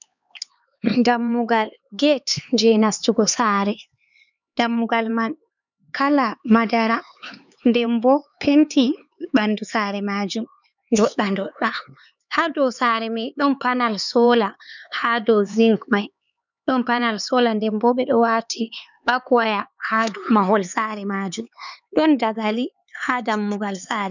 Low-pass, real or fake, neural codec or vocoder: 7.2 kHz; fake; codec, 16 kHz, 4 kbps, X-Codec, HuBERT features, trained on LibriSpeech